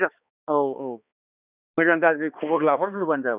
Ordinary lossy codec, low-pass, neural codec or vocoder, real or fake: none; 3.6 kHz; codec, 16 kHz, 2 kbps, X-Codec, HuBERT features, trained on balanced general audio; fake